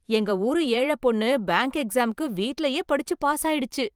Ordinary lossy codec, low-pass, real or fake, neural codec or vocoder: Opus, 32 kbps; 19.8 kHz; fake; vocoder, 44.1 kHz, 128 mel bands every 512 samples, BigVGAN v2